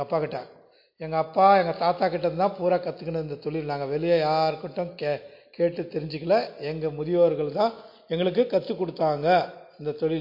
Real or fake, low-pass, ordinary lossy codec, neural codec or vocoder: real; 5.4 kHz; MP3, 32 kbps; none